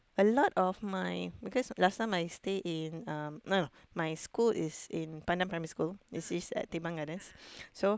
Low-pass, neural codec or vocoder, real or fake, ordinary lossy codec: none; none; real; none